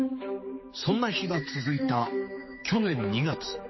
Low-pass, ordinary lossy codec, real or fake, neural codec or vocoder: 7.2 kHz; MP3, 24 kbps; fake; codec, 16 kHz, 4 kbps, X-Codec, HuBERT features, trained on general audio